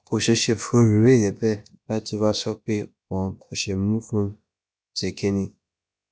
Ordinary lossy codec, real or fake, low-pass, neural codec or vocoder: none; fake; none; codec, 16 kHz, about 1 kbps, DyCAST, with the encoder's durations